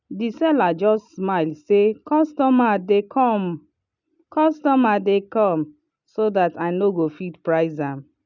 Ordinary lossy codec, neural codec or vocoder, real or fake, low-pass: none; none; real; 7.2 kHz